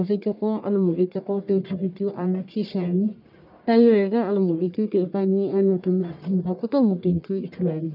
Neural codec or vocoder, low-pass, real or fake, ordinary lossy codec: codec, 44.1 kHz, 1.7 kbps, Pupu-Codec; 5.4 kHz; fake; none